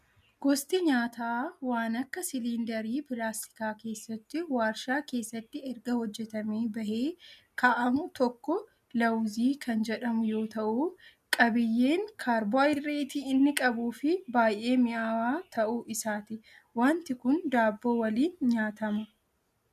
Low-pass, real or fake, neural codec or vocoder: 14.4 kHz; real; none